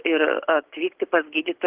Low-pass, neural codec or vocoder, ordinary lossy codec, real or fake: 3.6 kHz; none; Opus, 16 kbps; real